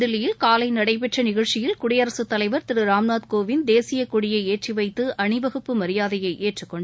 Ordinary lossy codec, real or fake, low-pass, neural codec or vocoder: none; real; none; none